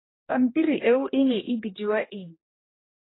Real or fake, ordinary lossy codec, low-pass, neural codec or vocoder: fake; AAC, 16 kbps; 7.2 kHz; codec, 16 kHz, 1 kbps, X-Codec, HuBERT features, trained on general audio